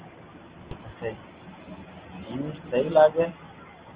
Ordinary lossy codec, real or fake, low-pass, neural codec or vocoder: Opus, 24 kbps; real; 3.6 kHz; none